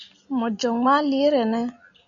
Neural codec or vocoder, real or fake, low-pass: none; real; 7.2 kHz